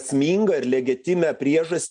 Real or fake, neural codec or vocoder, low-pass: real; none; 9.9 kHz